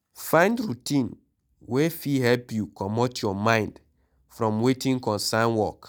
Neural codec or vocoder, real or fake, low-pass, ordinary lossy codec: none; real; none; none